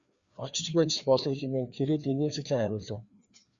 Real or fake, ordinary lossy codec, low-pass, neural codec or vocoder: fake; Opus, 64 kbps; 7.2 kHz; codec, 16 kHz, 2 kbps, FreqCodec, larger model